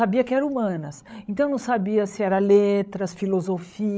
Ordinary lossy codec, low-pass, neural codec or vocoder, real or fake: none; none; codec, 16 kHz, 16 kbps, FreqCodec, larger model; fake